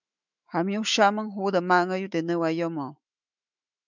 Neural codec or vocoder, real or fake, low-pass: autoencoder, 48 kHz, 128 numbers a frame, DAC-VAE, trained on Japanese speech; fake; 7.2 kHz